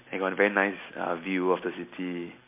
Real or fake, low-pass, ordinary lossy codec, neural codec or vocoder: real; 3.6 kHz; MP3, 24 kbps; none